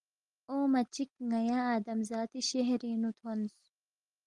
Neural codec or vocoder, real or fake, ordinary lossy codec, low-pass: none; real; Opus, 24 kbps; 10.8 kHz